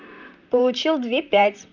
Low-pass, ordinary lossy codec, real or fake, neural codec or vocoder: 7.2 kHz; none; fake; vocoder, 44.1 kHz, 128 mel bands, Pupu-Vocoder